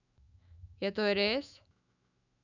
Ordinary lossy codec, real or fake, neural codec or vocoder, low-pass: none; fake; autoencoder, 48 kHz, 128 numbers a frame, DAC-VAE, trained on Japanese speech; 7.2 kHz